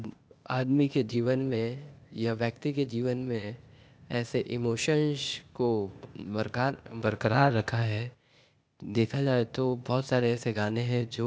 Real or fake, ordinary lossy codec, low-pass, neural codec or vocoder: fake; none; none; codec, 16 kHz, 0.8 kbps, ZipCodec